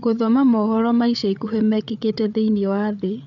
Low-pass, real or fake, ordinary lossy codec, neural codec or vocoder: 7.2 kHz; fake; none; codec, 16 kHz, 8 kbps, FreqCodec, larger model